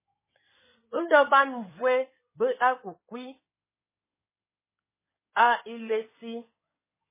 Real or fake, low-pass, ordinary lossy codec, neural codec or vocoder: fake; 3.6 kHz; MP3, 16 kbps; codec, 16 kHz in and 24 kHz out, 2.2 kbps, FireRedTTS-2 codec